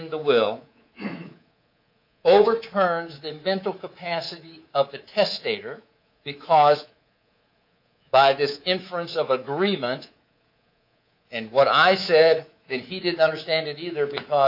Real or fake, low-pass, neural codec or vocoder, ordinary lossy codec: fake; 5.4 kHz; codec, 24 kHz, 3.1 kbps, DualCodec; AAC, 48 kbps